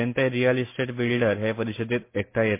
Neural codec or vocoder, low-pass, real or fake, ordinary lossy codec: none; 3.6 kHz; real; MP3, 24 kbps